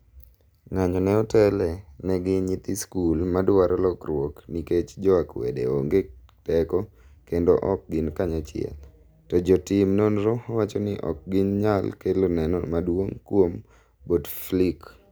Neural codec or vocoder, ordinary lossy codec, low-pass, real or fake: none; none; none; real